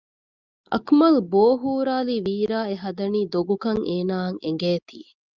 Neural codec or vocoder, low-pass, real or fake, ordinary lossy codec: none; 7.2 kHz; real; Opus, 32 kbps